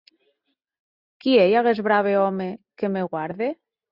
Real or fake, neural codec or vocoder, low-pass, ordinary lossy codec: real; none; 5.4 kHz; Opus, 64 kbps